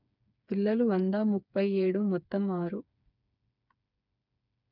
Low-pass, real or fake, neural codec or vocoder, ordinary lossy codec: 5.4 kHz; fake; codec, 16 kHz, 4 kbps, FreqCodec, smaller model; none